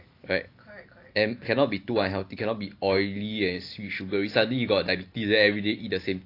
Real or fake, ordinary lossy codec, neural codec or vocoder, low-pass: real; AAC, 32 kbps; none; 5.4 kHz